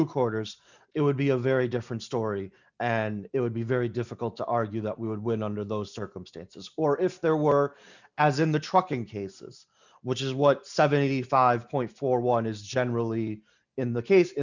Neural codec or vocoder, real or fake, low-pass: none; real; 7.2 kHz